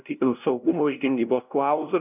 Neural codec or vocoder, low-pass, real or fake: codec, 16 kHz, 0.5 kbps, FunCodec, trained on LibriTTS, 25 frames a second; 3.6 kHz; fake